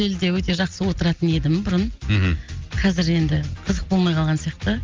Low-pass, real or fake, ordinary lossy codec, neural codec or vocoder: 7.2 kHz; real; Opus, 24 kbps; none